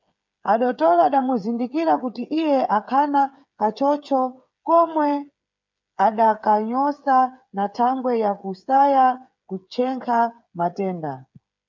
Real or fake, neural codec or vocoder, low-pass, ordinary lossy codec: fake; codec, 16 kHz, 8 kbps, FreqCodec, smaller model; 7.2 kHz; MP3, 64 kbps